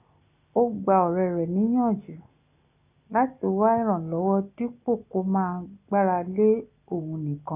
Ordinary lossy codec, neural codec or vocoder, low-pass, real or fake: none; none; 3.6 kHz; real